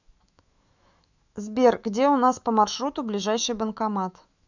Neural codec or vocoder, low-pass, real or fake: autoencoder, 48 kHz, 128 numbers a frame, DAC-VAE, trained on Japanese speech; 7.2 kHz; fake